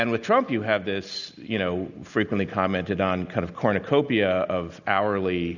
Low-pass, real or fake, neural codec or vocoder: 7.2 kHz; real; none